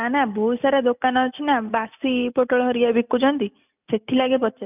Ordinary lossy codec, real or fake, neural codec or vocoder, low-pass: none; real; none; 3.6 kHz